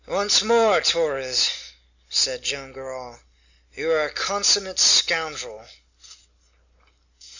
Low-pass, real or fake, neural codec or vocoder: 7.2 kHz; real; none